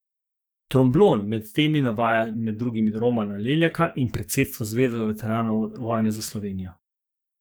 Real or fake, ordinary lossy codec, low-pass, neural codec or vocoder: fake; none; none; codec, 44.1 kHz, 2.6 kbps, SNAC